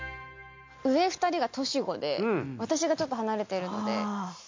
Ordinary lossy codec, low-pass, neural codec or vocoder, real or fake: MP3, 48 kbps; 7.2 kHz; none; real